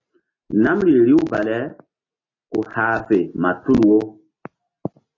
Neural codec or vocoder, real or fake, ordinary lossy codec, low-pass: none; real; AAC, 32 kbps; 7.2 kHz